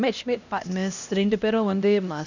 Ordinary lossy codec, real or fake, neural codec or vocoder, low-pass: none; fake; codec, 16 kHz, 1 kbps, X-Codec, HuBERT features, trained on LibriSpeech; 7.2 kHz